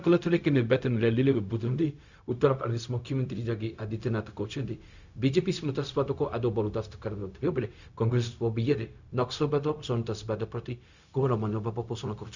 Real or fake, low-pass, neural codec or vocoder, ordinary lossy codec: fake; 7.2 kHz; codec, 16 kHz, 0.4 kbps, LongCat-Audio-Codec; none